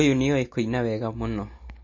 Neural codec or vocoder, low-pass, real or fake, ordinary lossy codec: none; 7.2 kHz; real; MP3, 32 kbps